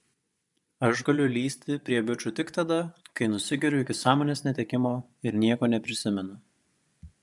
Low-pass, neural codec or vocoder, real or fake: 10.8 kHz; vocoder, 24 kHz, 100 mel bands, Vocos; fake